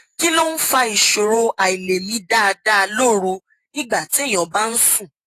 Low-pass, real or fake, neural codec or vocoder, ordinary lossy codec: 14.4 kHz; fake; vocoder, 48 kHz, 128 mel bands, Vocos; AAC, 48 kbps